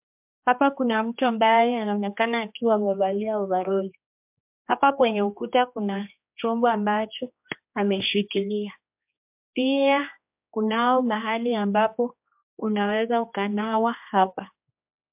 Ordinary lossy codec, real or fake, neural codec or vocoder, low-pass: MP3, 32 kbps; fake; codec, 16 kHz, 2 kbps, X-Codec, HuBERT features, trained on general audio; 3.6 kHz